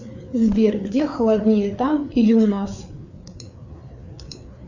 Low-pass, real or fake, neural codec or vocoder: 7.2 kHz; fake; codec, 16 kHz, 4 kbps, FreqCodec, larger model